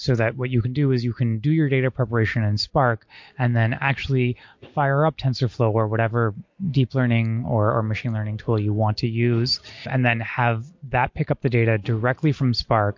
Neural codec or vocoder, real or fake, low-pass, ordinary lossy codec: none; real; 7.2 kHz; MP3, 48 kbps